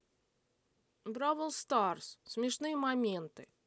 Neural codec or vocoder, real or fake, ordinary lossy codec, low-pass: none; real; none; none